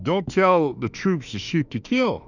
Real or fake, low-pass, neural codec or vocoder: fake; 7.2 kHz; autoencoder, 48 kHz, 32 numbers a frame, DAC-VAE, trained on Japanese speech